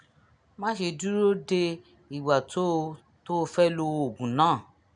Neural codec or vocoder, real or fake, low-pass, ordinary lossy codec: none; real; 9.9 kHz; none